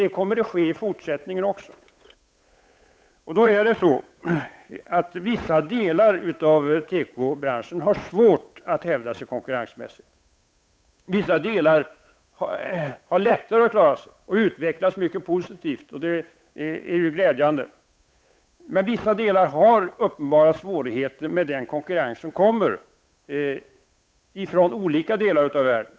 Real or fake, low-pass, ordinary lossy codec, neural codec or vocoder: fake; none; none; codec, 16 kHz, 8 kbps, FunCodec, trained on Chinese and English, 25 frames a second